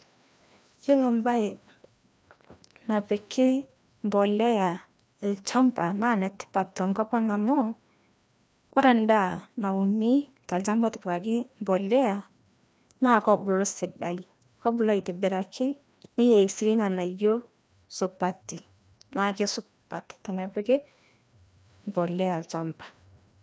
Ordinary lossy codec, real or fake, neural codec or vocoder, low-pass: none; fake; codec, 16 kHz, 1 kbps, FreqCodec, larger model; none